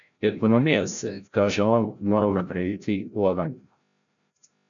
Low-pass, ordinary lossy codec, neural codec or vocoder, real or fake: 7.2 kHz; MP3, 96 kbps; codec, 16 kHz, 0.5 kbps, FreqCodec, larger model; fake